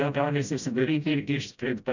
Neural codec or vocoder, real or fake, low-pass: codec, 16 kHz, 0.5 kbps, FreqCodec, smaller model; fake; 7.2 kHz